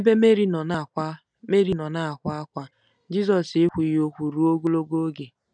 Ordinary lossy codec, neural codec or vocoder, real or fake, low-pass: none; none; real; 9.9 kHz